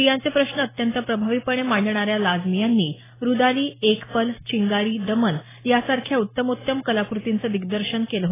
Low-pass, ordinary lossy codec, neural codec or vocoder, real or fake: 3.6 kHz; AAC, 16 kbps; none; real